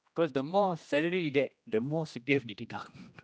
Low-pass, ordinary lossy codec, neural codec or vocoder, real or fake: none; none; codec, 16 kHz, 1 kbps, X-Codec, HuBERT features, trained on general audio; fake